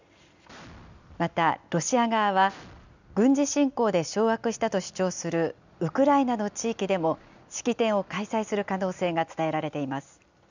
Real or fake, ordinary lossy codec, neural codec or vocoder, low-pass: real; none; none; 7.2 kHz